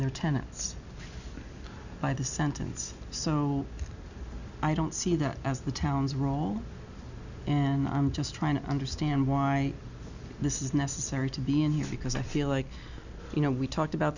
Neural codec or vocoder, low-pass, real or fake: none; 7.2 kHz; real